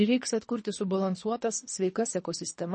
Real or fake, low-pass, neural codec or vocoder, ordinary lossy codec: fake; 10.8 kHz; codec, 24 kHz, 3 kbps, HILCodec; MP3, 32 kbps